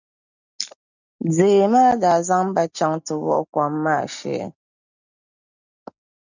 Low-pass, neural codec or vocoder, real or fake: 7.2 kHz; none; real